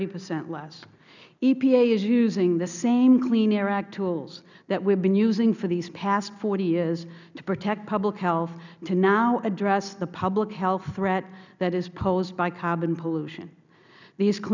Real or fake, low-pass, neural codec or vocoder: real; 7.2 kHz; none